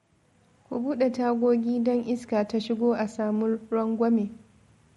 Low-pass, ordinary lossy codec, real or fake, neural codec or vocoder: 19.8 kHz; MP3, 48 kbps; real; none